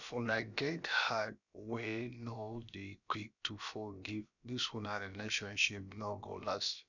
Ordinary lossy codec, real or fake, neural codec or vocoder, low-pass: none; fake; codec, 16 kHz, about 1 kbps, DyCAST, with the encoder's durations; 7.2 kHz